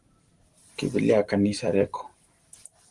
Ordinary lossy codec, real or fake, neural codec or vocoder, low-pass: Opus, 24 kbps; fake; vocoder, 44.1 kHz, 128 mel bands every 512 samples, BigVGAN v2; 10.8 kHz